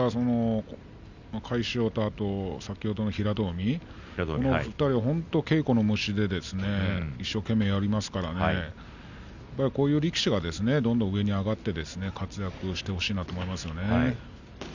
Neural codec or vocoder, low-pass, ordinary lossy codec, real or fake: none; 7.2 kHz; none; real